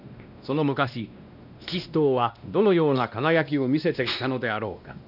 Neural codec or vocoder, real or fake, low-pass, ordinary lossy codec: codec, 16 kHz, 1 kbps, X-Codec, WavLM features, trained on Multilingual LibriSpeech; fake; 5.4 kHz; none